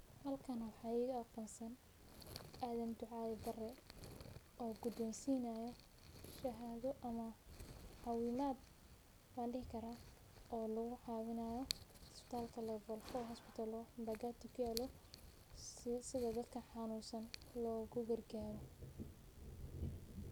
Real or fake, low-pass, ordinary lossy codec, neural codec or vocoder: real; none; none; none